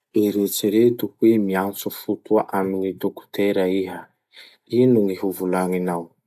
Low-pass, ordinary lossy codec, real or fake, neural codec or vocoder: 14.4 kHz; none; real; none